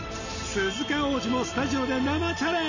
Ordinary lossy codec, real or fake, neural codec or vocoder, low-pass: none; real; none; 7.2 kHz